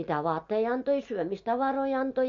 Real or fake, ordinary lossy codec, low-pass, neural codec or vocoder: real; MP3, 48 kbps; 7.2 kHz; none